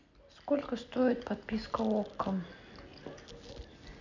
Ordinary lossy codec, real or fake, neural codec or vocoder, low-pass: none; real; none; 7.2 kHz